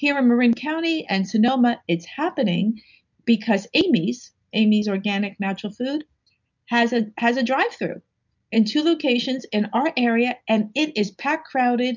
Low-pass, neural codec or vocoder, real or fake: 7.2 kHz; none; real